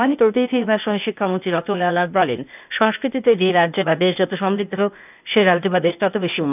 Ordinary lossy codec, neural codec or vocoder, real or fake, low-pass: none; codec, 16 kHz, 0.8 kbps, ZipCodec; fake; 3.6 kHz